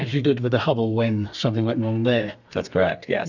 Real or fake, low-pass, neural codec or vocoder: fake; 7.2 kHz; codec, 32 kHz, 1.9 kbps, SNAC